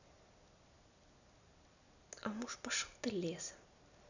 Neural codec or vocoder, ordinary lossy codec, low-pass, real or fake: none; none; 7.2 kHz; real